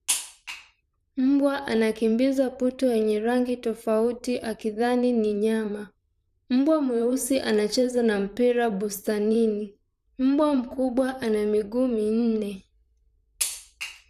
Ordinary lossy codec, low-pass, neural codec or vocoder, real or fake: none; 14.4 kHz; vocoder, 44.1 kHz, 128 mel bands, Pupu-Vocoder; fake